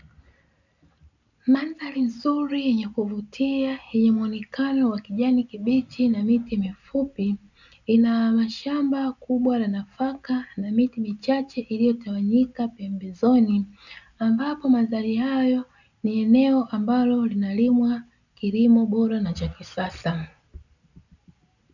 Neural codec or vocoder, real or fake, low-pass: none; real; 7.2 kHz